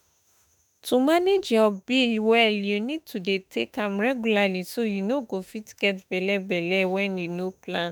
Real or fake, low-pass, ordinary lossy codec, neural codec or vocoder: fake; none; none; autoencoder, 48 kHz, 32 numbers a frame, DAC-VAE, trained on Japanese speech